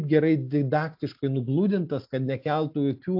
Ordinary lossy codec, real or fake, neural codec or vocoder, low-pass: AAC, 48 kbps; real; none; 5.4 kHz